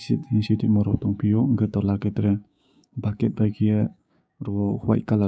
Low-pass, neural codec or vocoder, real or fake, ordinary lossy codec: none; codec, 16 kHz, 6 kbps, DAC; fake; none